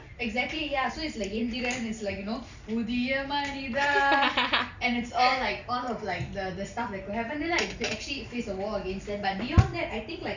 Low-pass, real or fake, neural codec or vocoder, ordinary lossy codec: 7.2 kHz; real; none; none